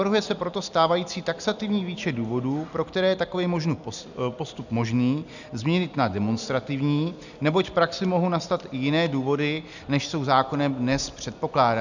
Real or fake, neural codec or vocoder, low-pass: real; none; 7.2 kHz